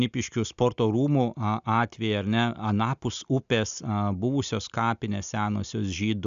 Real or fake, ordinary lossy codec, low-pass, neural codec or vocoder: real; AAC, 96 kbps; 7.2 kHz; none